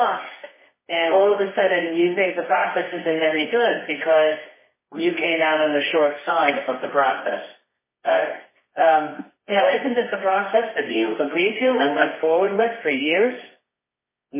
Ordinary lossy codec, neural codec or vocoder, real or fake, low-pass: MP3, 16 kbps; codec, 24 kHz, 0.9 kbps, WavTokenizer, medium music audio release; fake; 3.6 kHz